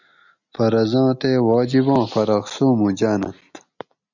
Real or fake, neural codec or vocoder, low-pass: real; none; 7.2 kHz